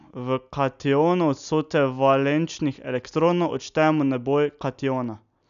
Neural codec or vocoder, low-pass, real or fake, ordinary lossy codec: none; 7.2 kHz; real; none